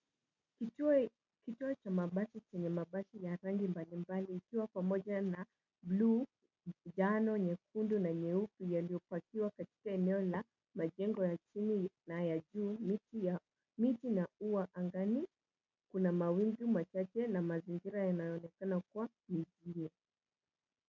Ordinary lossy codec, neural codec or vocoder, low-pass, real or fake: MP3, 64 kbps; none; 7.2 kHz; real